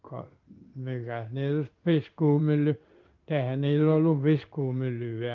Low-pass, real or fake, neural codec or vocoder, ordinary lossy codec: 7.2 kHz; fake; codec, 16 kHz in and 24 kHz out, 1 kbps, XY-Tokenizer; Opus, 32 kbps